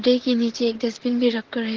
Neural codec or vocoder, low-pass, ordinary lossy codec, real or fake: vocoder, 44.1 kHz, 128 mel bands, Pupu-Vocoder; 7.2 kHz; Opus, 16 kbps; fake